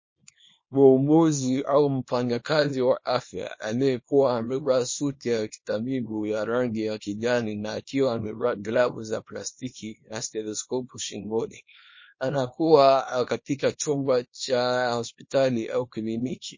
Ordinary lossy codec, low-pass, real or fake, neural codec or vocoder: MP3, 32 kbps; 7.2 kHz; fake; codec, 24 kHz, 0.9 kbps, WavTokenizer, small release